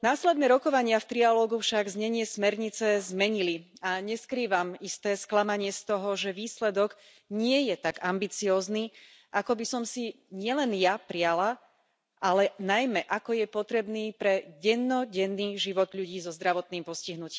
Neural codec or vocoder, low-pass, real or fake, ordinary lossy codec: none; none; real; none